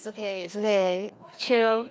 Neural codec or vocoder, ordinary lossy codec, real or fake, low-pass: codec, 16 kHz, 2 kbps, FreqCodec, larger model; none; fake; none